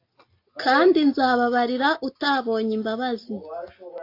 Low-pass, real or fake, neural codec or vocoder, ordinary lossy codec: 5.4 kHz; real; none; AAC, 32 kbps